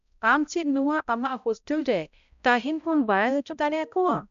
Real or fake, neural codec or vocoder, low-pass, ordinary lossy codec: fake; codec, 16 kHz, 0.5 kbps, X-Codec, HuBERT features, trained on balanced general audio; 7.2 kHz; none